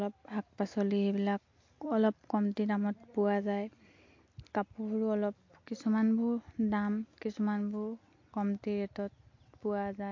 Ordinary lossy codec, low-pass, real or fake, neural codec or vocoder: MP3, 48 kbps; 7.2 kHz; real; none